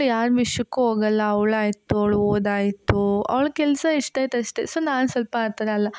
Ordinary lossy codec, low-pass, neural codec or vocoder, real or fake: none; none; none; real